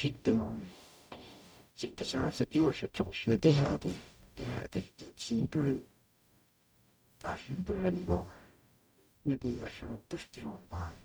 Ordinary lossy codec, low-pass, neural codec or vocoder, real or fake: none; none; codec, 44.1 kHz, 0.9 kbps, DAC; fake